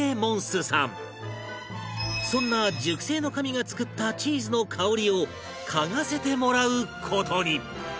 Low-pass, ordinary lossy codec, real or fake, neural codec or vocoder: none; none; real; none